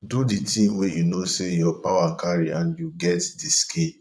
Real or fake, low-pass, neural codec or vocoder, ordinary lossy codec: fake; none; vocoder, 22.05 kHz, 80 mel bands, Vocos; none